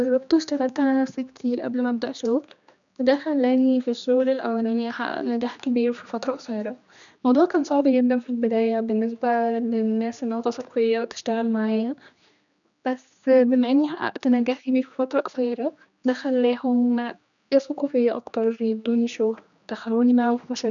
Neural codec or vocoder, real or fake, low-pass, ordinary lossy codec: codec, 16 kHz, 2 kbps, X-Codec, HuBERT features, trained on general audio; fake; 7.2 kHz; none